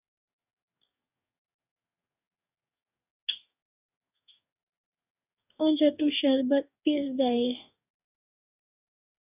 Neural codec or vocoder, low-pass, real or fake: codec, 44.1 kHz, 2.6 kbps, DAC; 3.6 kHz; fake